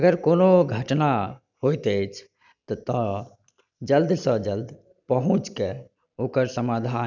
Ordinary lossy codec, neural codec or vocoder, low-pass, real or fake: none; none; 7.2 kHz; real